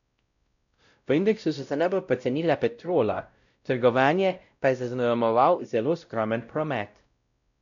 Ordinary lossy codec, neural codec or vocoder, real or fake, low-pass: none; codec, 16 kHz, 0.5 kbps, X-Codec, WavLM features, trained on Multilingual LibriSpeech; fake; 7.2 kHz